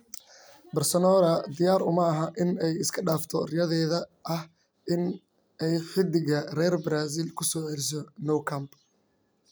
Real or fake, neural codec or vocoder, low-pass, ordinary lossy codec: real; none; none; none